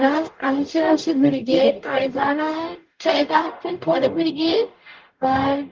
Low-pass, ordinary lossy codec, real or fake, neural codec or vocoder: 7.2 kHz; Opus, 32 kbps; fake; codec, 44.1 kHz, 0.9 kbps, DAC